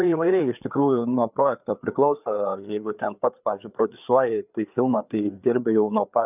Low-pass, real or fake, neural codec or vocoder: 3.6 kHz; fake; codec, 16 kHz, 4 kbps, FreqCodec, larger model